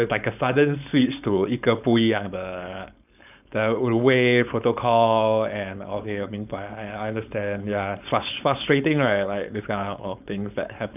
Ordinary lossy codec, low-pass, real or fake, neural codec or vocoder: none; 3.6 kHz; fake; codec, 16 kHz, 4.8 kbps, FACodec